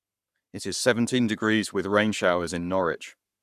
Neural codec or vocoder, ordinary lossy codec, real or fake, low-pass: codec, 44.1 kHz, 7.8 kbps, Pupu-Codec; none; fake; 14.4 kHz